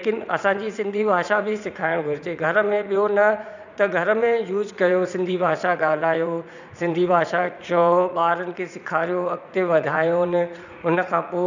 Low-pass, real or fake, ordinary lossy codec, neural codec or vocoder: 7.2 kHz; fake; none; vocoder, 44.1 kHz, 80 mel bands, Vocos